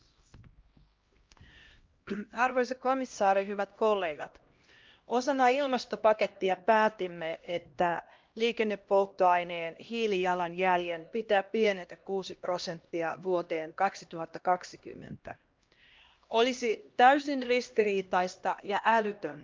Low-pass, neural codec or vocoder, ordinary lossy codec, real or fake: 7.2 kHz; codec, 16 kHz, 1 kbps, X-Codec, HuBERT features, trained on LibriSpeech; Opus, 24 kbps; fake